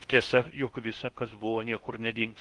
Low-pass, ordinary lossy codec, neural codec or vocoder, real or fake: 10.8 kHz; Opus, 24 kbps; codec, 16 kHz in and 24 kHz out, 0.8 kbps, FocalCodec, streaming, 65536 codes; fake